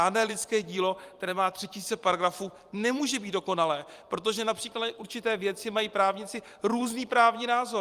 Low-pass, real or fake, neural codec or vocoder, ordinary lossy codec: 14.4 kHz; fake; vocoder, 44.1 kHz, 128 mel bands every 256 samples, BigVGAN v2; Opus, 32 kbps